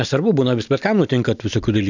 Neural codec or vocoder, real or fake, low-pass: none; real; 7.2 kHz